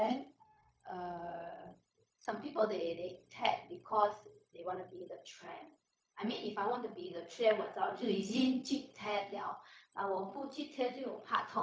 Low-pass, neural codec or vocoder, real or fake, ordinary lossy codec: none; codec, 16 kHz, 0.4 kbps, LongCat-Audio-Codec; fake; none